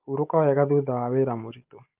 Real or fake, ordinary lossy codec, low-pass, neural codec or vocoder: real; Opus, 32 kbps; 3.6 kHz; none